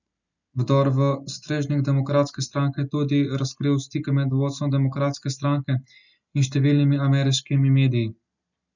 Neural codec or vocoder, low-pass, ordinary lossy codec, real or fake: none; 7.2 kHz; none; real